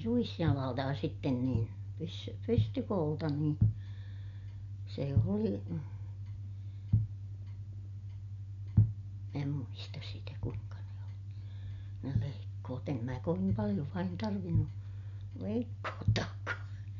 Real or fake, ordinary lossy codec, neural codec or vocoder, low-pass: real; none; none; 7.2 kHz